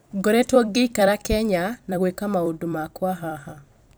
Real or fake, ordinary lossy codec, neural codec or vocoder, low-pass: fake; none; vocoder, 44.1 kHz, 128 mel bands every 256 samples, BigVGAN v2; none